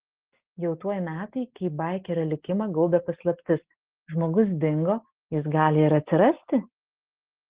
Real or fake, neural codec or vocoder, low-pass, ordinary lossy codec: real; none; 3.6 kHz; Opus, 16 kbps